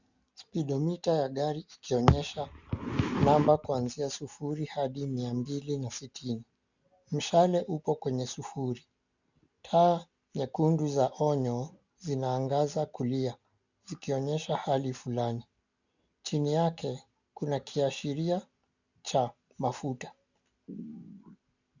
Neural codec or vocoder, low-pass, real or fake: none; 7.2 kHz; real